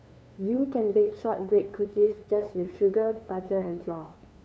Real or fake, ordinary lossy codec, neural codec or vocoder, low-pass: fake; none; codec, 16 kHz, 2 kbps, FunCodec, trained on LibriTTS, 25 frames a second; none